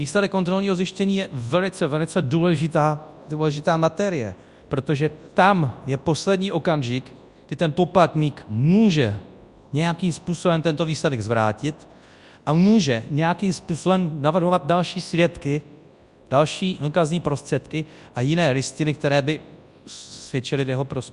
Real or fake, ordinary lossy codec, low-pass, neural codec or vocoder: fake; Opus, 64 kbps; 10.8 kHz; codec, 24 kHz, 0.9 kbps, WavTokenizer, large speech release